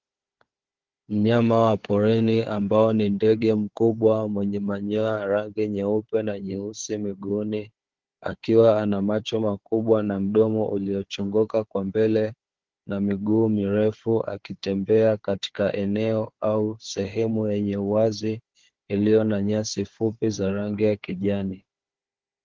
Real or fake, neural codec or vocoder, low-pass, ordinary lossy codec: fake; codec, 16 kHz, 4 kbps, FunCodec, trained on Chinese and English, 50 frames a second; 7.2 kHz; Opus, 16 kbps